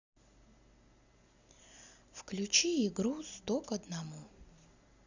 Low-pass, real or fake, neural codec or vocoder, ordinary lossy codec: 7.2 kHz; real; none; Opus, 64 kbps